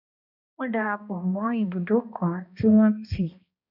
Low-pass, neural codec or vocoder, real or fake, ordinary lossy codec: 5.4 kHz; codec, 16 kHz, 1 kbps, X-Codec, HuBERT features, trained on balanced general audio; fake; none